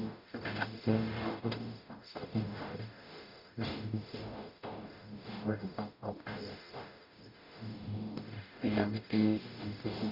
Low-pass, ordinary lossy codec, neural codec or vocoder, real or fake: 5.4 kHz; none; codec, 44.1 kHz, 0.9 kbps, DAC; fake